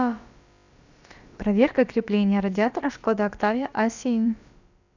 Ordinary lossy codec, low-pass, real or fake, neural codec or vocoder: none; 7.2 kHz; fake; codec, 16 kHz, about 1 kbps, DyCAST, with the encoder's durations